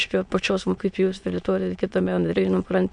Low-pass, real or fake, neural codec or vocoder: 9.9 kHz; fake; autoencoder, 22.05 kHz, a latent of 192 numbers a frame, VITS, trained on many speakers